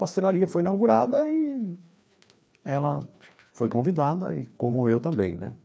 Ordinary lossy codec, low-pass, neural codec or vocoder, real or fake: none; none; codec, 16 kHz, 2 kbps, FreqCodec, larger model; fake